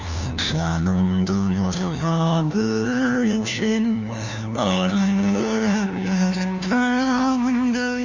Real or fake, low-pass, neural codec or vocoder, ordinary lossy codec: fake; 7.2 kHz; codec, 16 kHz, 1 kbps, FunCodec, trained on LibriTTS, 50 frames a second; none